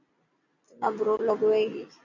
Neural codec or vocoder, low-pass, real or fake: none; 7.2 kHz; real